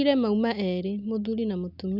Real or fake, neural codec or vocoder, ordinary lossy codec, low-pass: real; none; Opus, 64 kbps; 5.4 kHz